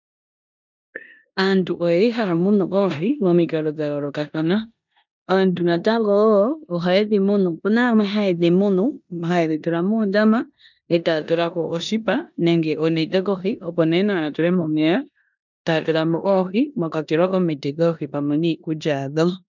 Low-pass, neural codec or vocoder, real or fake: 7.2 kHz; codec, 16 kHz in and 24 kHz out, 0.9 kbps, LongCat-Audio-Codec, four codebook decoder; fake